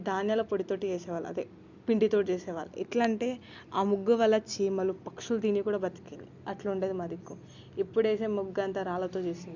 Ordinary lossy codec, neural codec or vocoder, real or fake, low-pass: Opus, 64 kbps; none; real; 7.2 kHz